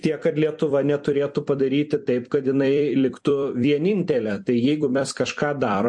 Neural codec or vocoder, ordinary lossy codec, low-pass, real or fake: vocoder, 44.1 kHz, 128 mel bands every 256 samples, BigVGAN v2; MP3, 48 kbps; 10.8 kHz; fake